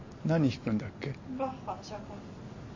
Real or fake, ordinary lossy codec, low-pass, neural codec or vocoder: real; MP3, 32 kbps; 7.2 kHz; none